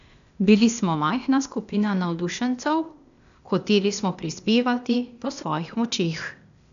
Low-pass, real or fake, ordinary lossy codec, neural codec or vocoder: 7.2 kHz; fake; none; codec, 16 kHz, 0.8 kbps, ZipCodec